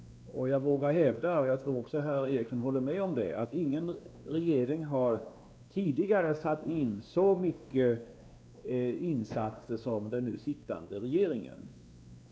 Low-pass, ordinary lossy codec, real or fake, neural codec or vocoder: none; none; fake; codec, 16 kHz, 2 kbps, X-Codec, WavLM features, trained on Multilingual LibriSpeech